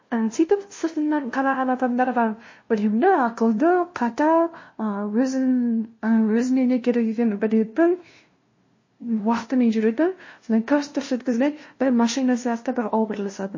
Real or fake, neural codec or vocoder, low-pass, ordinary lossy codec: fake; codec, 16 kHz, 0.5 kbps, FunCodec, trained on LibriTTS, 25 frames a second; 7.2 kHz; MP3, 32 kbps